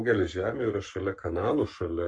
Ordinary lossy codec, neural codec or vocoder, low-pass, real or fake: AAC, 64 kbps; codec, 44.1 kHz, 7.8 kbps, Pupu-Codec; 9.9 kHz; fake